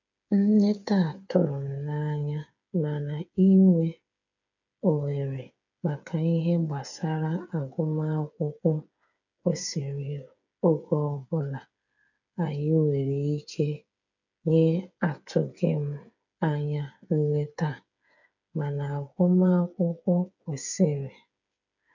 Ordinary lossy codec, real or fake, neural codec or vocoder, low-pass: none; fake; codec, 16 kHz, 8 kbps, FreqCodec, smaller model; 7.2 kHz